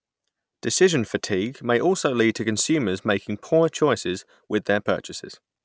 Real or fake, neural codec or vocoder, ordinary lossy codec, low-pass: real; none; none; none